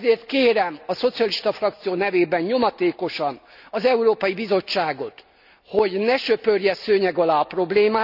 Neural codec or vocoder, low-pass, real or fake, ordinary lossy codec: none; 5.4 kHz; real; none